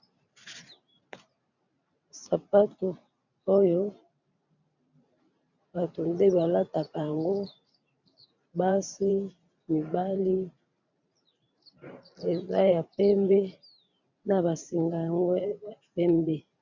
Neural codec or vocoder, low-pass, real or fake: vocoder, 44.1 kHz, 128 mel bands every 512 samples, BigVGAN v2; 7.2 kHz; fake